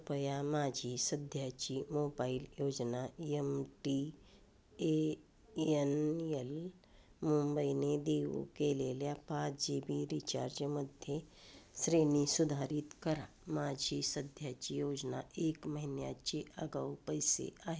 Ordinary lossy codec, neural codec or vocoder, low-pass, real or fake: none; none; none; real